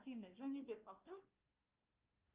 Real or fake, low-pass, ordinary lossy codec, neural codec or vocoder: fake; 3.6 kHz; Opus, 24 kbps; codec, 16 kHz, 1 kbps, FunCodec, trained on Chinese and English, 50 frames a second